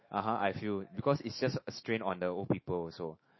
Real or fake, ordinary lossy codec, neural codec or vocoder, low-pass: fake; MP3, 24 kbps; autoencoder, 48 kHz, 128 numbers a frame, DAC-VAE, trained on Japanese speech; 7.2 kHz